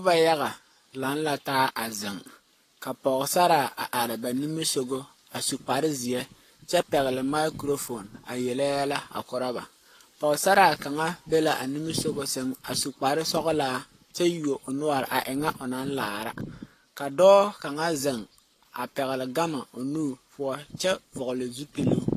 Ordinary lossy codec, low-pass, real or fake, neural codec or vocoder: AAC, 48 kbps; 14.4 kHz; fake; codec, 44.1 kHz, 7.8 kbps, Pupu-Codec